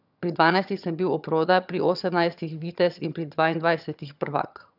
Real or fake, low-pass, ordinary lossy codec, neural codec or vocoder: fake; 5.4 kHz; none; vocoder, 22.05 kHz, 80 mel bands, HiFi-GAN